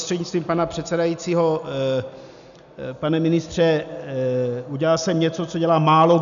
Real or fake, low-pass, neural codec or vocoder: real; 7.2 kHz; none